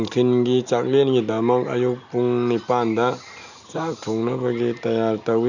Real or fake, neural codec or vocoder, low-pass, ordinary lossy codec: real; none; 7.2 kHz; none